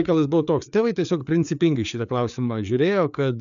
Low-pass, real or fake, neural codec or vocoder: 7.2 kHz; fake; codec, 16 kHz, 4 kbps, FreqCodec, larger model